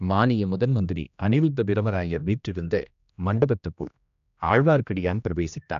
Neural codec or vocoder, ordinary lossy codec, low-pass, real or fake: codec, 16 kHz, 1 kbps, X-Codec, HuBERT features, trained on general audio; none; 7.2 kHz; fake